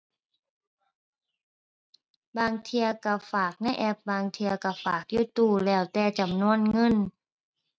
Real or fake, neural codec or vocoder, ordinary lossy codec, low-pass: real; none; none; none